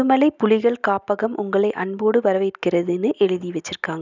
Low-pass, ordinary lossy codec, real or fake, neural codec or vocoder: 7.2 kHz; none; real; none